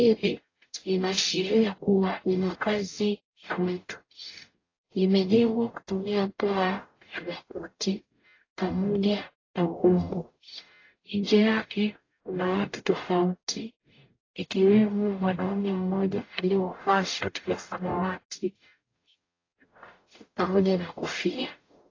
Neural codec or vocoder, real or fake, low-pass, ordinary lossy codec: codec, 44.1 kHz, 0.9 kbps, DAC; fake; 7.2 kHz; AAC, 32 kbps